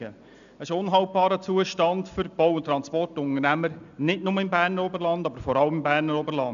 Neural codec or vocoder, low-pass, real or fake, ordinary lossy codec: none; 7.2 kHz; real; none